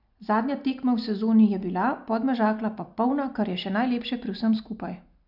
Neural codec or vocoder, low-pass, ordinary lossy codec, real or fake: none; 5.4 kHz; none; real